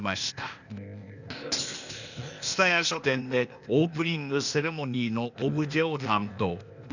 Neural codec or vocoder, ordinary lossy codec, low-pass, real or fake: codec, 16 kHz, 0.8 kbps, ZipCodec; none; 7.2 kHz; fake